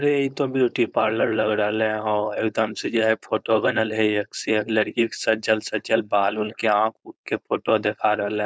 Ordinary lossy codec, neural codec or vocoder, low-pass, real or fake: none; codec, 16 kHz, 4.8 kbps, FACodec; none; fake